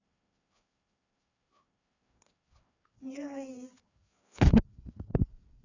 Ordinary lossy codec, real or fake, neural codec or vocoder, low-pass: none; fake; codec, 16 kHz, 2 kbps, FreqCodec, larger model; 7.2 kHz